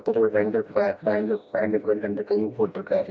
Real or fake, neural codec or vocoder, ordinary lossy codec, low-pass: fake; codec, 16 kHz, 1 kbps, FreqCodec, smaller model; none; none